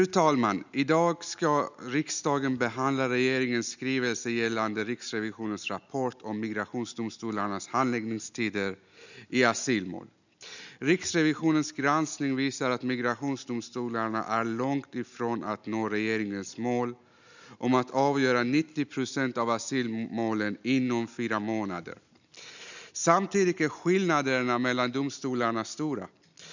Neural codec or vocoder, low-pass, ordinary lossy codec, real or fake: none; 7.2 kHz; none; real